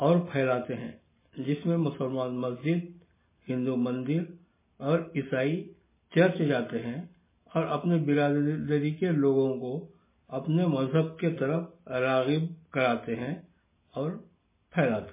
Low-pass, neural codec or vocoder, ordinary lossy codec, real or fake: 3.6 kHz; none; MP3, 16 kbps; real